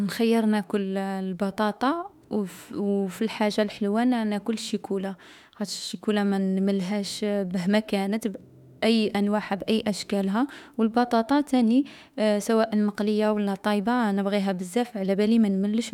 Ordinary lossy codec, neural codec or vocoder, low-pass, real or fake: none; autoencoder, 48 kHz, 32 numbers a frame, DAC-VAE, trained on Japanese speech; 19.8 kHz; fake